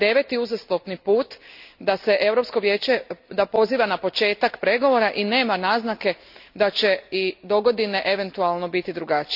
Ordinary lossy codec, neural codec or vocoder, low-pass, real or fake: none; none; 5.4 kHz; real